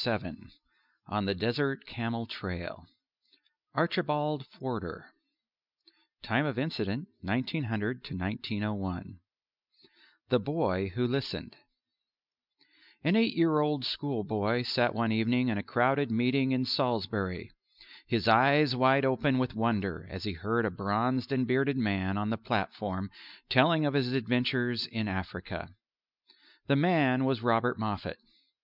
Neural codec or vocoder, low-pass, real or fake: none; 5.4 kHz; real